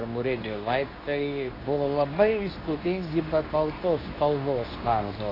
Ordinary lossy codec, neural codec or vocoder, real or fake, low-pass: AAC, 24 kbps; codec, 24 kHz, 0.9 kbps, WavTokenizer, medium speech release version 2; fake; 5.4 kHz